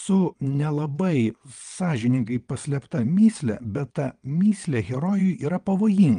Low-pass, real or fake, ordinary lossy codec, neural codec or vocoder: 9.9 kHz; fake; Opus, 32 kbps; vocoder, 22.05 kHz, 80 mel bands, WaveNeXt